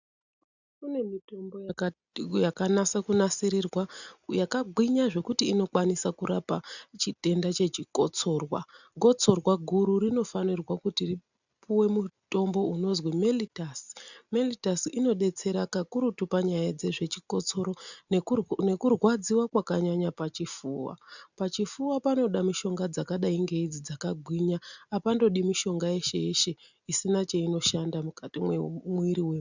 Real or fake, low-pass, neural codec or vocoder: real; 7.2 kHz; none